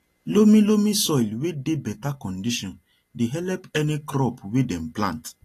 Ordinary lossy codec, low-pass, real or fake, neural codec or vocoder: AAC, 48 kbps; 14.4 kHz; real; none